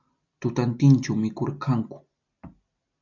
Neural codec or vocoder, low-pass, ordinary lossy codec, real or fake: none; 7.2 kHz; MP3, 64 kbps; real